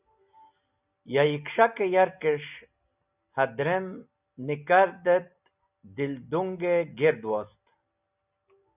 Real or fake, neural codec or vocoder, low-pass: real; none; 3.6 kHz